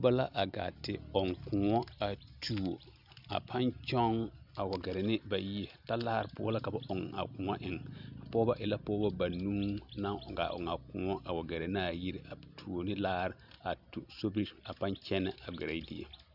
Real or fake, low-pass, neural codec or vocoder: real; 5.4 kHz; none